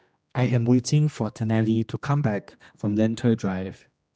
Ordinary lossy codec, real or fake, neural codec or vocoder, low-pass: none; fake; codec, 16 kHz, 1 kbps, X-Codec, HuBERT features, trained on general audio; none